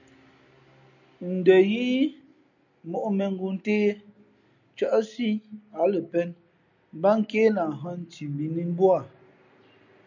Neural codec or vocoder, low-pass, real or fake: none; 7.2 kHz; real